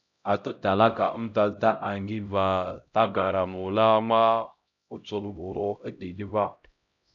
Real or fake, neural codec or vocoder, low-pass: fake; codec, 16 kHz, 0.5 kbps, X-Codec, HuBERT features, trained on LibriSpeech; 7.2 kHz